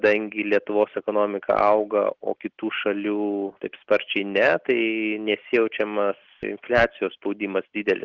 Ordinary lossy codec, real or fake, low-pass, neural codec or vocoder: Opus, 16 kbps; real; 7.2 kHz; none